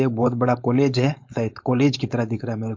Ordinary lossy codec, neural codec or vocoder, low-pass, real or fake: MP3, 48 kbps; codec, 16 kHz, 4.8 kbps, FACodec; 7.2 kHz; fake